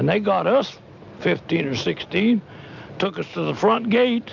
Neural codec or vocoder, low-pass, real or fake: none; 7.2 kHz; real